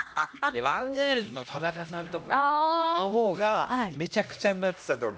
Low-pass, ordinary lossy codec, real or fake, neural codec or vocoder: none; none; fake; codec, 16 kHz, 1 kbps, X-Codec, HuBERT features, trained on LibriSpeech